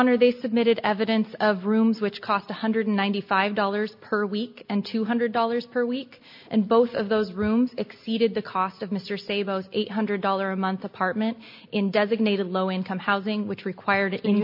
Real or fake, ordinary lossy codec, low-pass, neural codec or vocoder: real; MP3, 32 kbps; 5.4 kHz; none